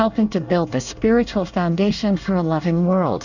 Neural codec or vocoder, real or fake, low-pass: codec, 24 kHz, 1 kbps, SNAC; fake; 7.2 kHz